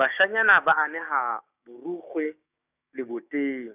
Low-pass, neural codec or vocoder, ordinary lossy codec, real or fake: 3.6 kHz; codec, 16 kHz, 6 kbps, DAC; none; fake